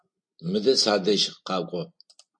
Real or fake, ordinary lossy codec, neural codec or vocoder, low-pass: real; MP3, 48 kbps; none; 9.9 kHz